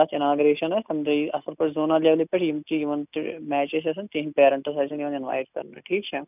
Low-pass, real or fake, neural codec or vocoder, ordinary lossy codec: 3.6 kHz; real; none; none